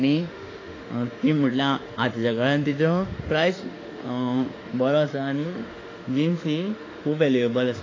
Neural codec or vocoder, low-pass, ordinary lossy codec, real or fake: autoencoder, 48 kHz, 32 numbers a frame, DAC-VAE, trained on Japanese speech; 7.2 kHz; AAC, 32 kbps; fake